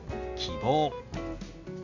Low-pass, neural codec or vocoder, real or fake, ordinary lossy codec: 7.2 kHz; none; real; none